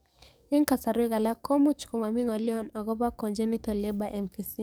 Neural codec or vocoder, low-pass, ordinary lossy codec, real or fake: codec, 44.1 kHz, 7.8 kbps, DAC; none; none; fake